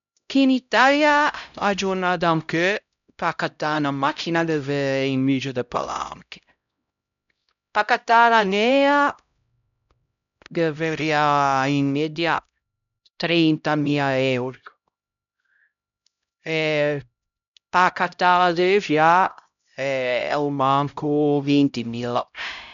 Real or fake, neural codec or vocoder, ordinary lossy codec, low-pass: fake; codec, 16 kHz, 0.5 kbps, X-Codec, HuBERT features, trained on LibriSpeech; none; 7.2 kHz